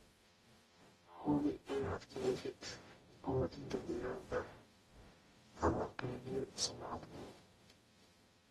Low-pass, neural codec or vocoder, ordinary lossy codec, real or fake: 19.8 kHz; codec, 44.1 kHz, 0.9 kbps, DAC; AAC, 32 kbps; fake